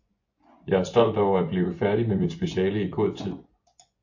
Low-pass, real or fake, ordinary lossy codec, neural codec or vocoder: 7.2 kHz; fake; AAC, 48 kbps; vocoder, 24 kHz, 100 mel bands, Vocos